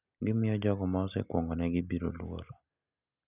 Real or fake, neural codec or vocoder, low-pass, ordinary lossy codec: real; none; 3.6 kHz; none